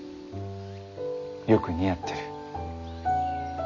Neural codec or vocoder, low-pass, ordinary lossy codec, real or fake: none; 7.2 kHz; none; real